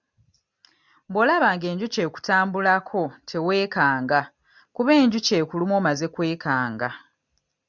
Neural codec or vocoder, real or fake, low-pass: none; real; 7.2 kHz